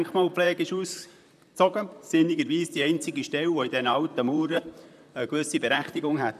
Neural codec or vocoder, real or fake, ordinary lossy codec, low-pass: vocoder, 44.1 kHz, 128 mel bands, Pupu-Vocoder; fake; none; 14.4 kHz